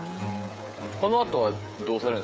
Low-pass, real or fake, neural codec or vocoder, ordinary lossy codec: none; fake; codec, 16 kHz, 16 kbps, FreqCodec, smaller model; none